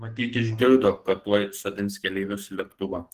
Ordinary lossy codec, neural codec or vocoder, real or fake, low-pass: Opus, 16 kbps; codec, 44.1 kHz, 2.6 kbps, SNAC; fake; 14.4 kHz